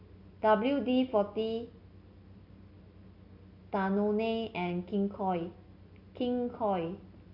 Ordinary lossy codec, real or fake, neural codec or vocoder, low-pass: none; real; none; 5.4 kHz